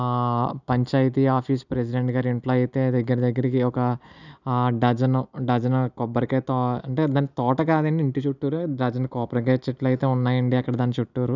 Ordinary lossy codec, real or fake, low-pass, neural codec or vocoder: none; real; 7.2 kHz; none